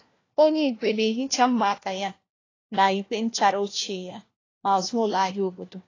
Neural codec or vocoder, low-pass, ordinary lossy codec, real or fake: codec, 16 kHz, 1 kbps, FunCodec, trained on LibriTTS, 50 frames a second; 7.2 kHz; AAC, 32 kbps; fake